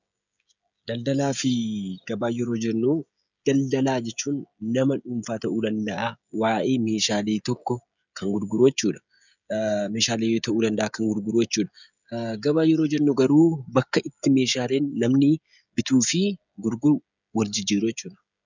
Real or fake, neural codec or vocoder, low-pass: fake; codec, 16 kHz, 16 kbps, FreqCodec, smaller model; 7.2 kHz